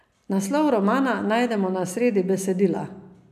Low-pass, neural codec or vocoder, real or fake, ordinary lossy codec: 14.4 kHz; none; real; none